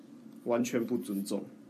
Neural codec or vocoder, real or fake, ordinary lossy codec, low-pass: vocoder, 44.1 kHz, 128 mel bands every 256 samples, BigVGAN v2; fake; MP3, 64 kbps; 14.4 kHz